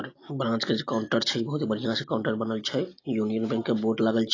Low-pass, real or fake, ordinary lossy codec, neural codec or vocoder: 7.2 kHz; real; AAC, 32 kbps; none